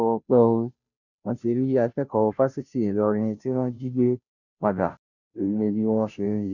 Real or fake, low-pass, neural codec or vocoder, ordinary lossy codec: fake; 7.2 kHz; codec, 16 kHz, 0.5 kbps, FunCodec, trained on Chinese and English, 25 frames a second; none